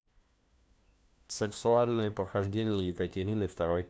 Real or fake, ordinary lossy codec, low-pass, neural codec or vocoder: fake; none; none; codec, 16 kHz, 1 kbps, FunCodec, trained on LibriTTS, 50 frames a second